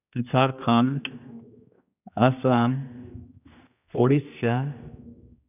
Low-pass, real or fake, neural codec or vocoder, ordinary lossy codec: 3.6 kHz; fake; codec, 16 kHz, 1 kbps, X-Codec, HuBERT features, trained on general audio; none